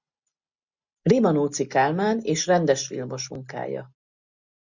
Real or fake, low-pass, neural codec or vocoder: real; 7.2 kHz; none